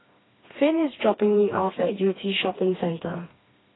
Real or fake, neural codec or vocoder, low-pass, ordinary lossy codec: fake; codec, 16 kHz, 2 kbps, FreqCodec, smaller model; 7.2 kHz; AAC, 16 kbps